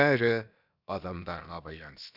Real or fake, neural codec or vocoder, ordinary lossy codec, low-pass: fake; codec, 16 kHz, 0.7 kbps, FocalCodec; none; 5.4 kHz